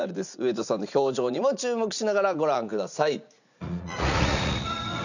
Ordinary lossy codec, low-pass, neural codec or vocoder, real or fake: none; 7.2 kHz; vocoder, 44.1 kHz, 80 mel bands, Vocos; fake